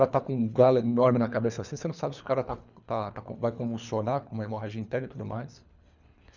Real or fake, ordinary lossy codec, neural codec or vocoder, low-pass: fake; none; codec, 24 kHz, 3 kbps, HILCodec; 7.2 kHz